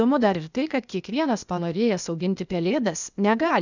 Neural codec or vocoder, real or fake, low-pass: codec, 16 kHz, 0.8 kbps, ZipCodec; fake; 7.2 kHz